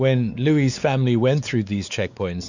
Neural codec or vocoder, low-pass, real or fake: codec, 16 kHz, 4 kbps, X-Codec, WavLM features, trained on Multilingual LibriSpeech; 7.2 kHz; fake